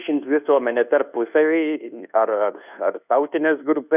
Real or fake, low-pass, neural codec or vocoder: fake; 3.6 kHz; codec, 24 kHz, 1.2 kbps, DualCodec